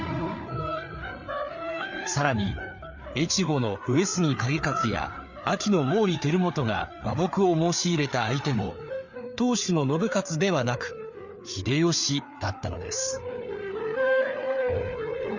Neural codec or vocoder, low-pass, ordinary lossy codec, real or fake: codec, 16 kHz, 4 kbps, FreqCodec, larger model; 7.2 kHz; none; fake